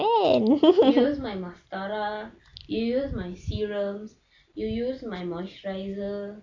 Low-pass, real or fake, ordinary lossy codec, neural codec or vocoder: 7.2 kHz; real; AAC, 48 kbps; none